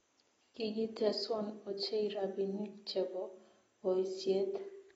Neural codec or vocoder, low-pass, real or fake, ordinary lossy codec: none; 10.8 kHz; real; AAC, 24 kbps